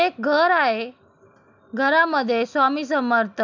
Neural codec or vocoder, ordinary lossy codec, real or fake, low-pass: none; none; real; 7.2 kHz